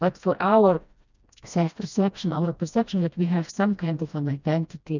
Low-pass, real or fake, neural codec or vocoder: 7.2 kHz; fake; codec, 16 kHz, 1 kbps, FreqCodec, smaller model